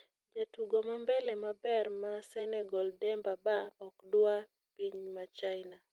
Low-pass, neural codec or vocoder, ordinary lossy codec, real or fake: 19.8 kHz; vocoder, 44.1 kHz, 128 mel bands every 512 samples, BigVGAN v2; Opus, 32 kbps; fake